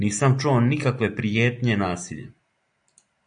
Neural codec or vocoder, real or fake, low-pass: none; real; 9.9 kHz